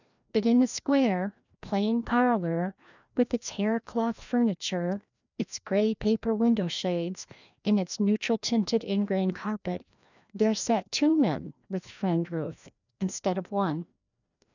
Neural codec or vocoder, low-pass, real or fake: codec, 16 kHz, 1 kbps, FreqCodec, larger model; 7.2 kHz; fake